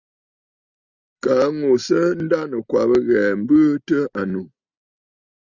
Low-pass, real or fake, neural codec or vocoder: 7.2 kHz; real; none